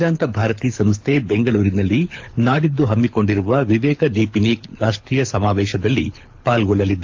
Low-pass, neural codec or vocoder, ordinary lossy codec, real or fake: 7.2 kHz; codec, 24 kHz, 6 kbps, HILCodec; AAC, 48 kbps; fake